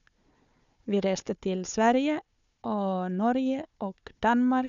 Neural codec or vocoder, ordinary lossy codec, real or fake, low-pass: codec, 16 kHz, 4 kbps, FunCodec, trained on Chinese and English, 50 frames a second; none; fake; 7.2 kHz